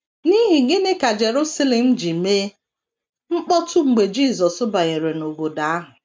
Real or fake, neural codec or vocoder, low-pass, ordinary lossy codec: real; none; none; none